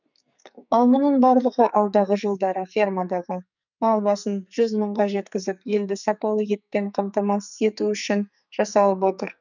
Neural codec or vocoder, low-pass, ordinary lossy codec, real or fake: codec, 44.1 kHz, 2.6 kbps, SNAC; 7.2 kHz; none; fake